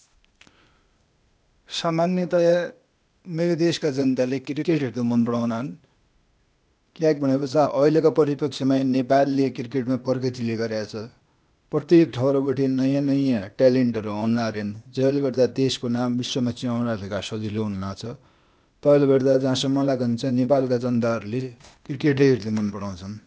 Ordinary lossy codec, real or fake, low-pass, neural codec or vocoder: none; fake; none; codec, 16 kHz, 0.8 kbps, ZipCodec